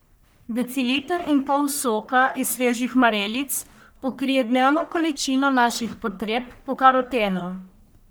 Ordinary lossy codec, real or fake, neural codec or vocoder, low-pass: none; fake; codec, 44.1 kHz, 1.7 kbps, Pupu-Codec; none